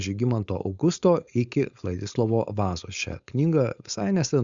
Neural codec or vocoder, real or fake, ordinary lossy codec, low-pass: codec, 16 kHz, 4.8 kbps, FACodec; fake; Opus, 64 kbps; 7.2 kHz